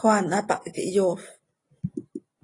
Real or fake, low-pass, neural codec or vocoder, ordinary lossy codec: real; 10.8 kHz; none; AAC, 32 kbps